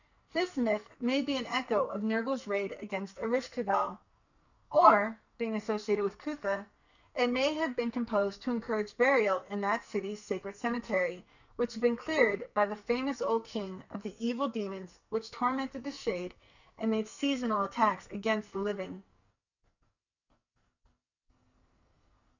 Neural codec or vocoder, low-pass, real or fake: codec, 44.1 kHz, 2.6 kbps, SNAC; 7.2 kHz; fake